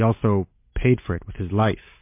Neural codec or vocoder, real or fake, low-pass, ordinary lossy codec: none; real; 3.6 kHz; MP3, 24 kbps